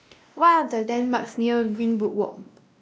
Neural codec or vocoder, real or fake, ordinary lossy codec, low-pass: codec, 16 kHz, 1 kbps, X-Codec, WavLM features, trained on Multilingual LibriSpeech; fake; none; none